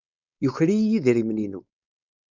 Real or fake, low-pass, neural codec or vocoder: fake; 7.2 kHz; codec, 16 kHz, 4.8 kbps, FACodec